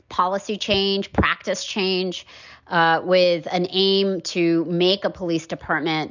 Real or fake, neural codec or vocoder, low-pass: real; none; 7.2 kHz